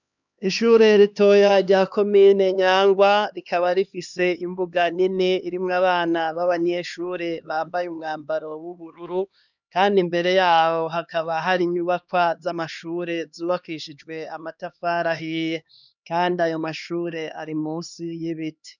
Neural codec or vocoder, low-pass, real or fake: codec, 16 kHz, 2 kbps, X-Codec, HuBERT features, trained on LibriSpeech; 7.2 kHz; fake